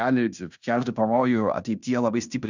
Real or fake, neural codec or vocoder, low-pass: fake; codec, 16 kHz in and 24 kHz out, 0.9 kbps, LongCat-Audio-Codec, fine tuned four codebook decoder; 7.2 kHz